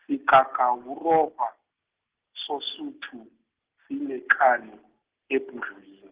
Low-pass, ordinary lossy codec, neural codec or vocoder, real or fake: 3.6 kHz; Opus, 16 kbps; none; real